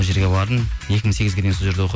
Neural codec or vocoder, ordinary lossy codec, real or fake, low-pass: none; none; real; none